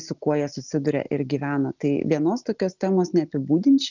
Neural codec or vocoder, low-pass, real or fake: none; 7.2 kHz; real